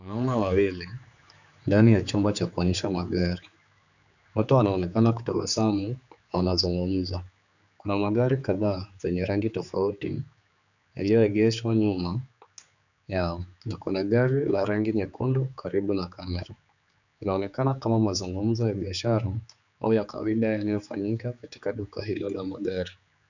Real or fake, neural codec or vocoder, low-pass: fake; codec, 16 kHz, 4 kbps, X-Codec, HuBERT features, trained on general audio; 7.2 kHz